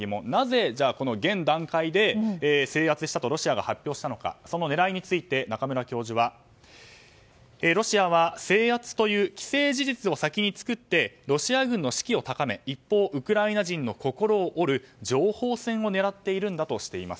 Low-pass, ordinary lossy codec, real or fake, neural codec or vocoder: none; none; real; none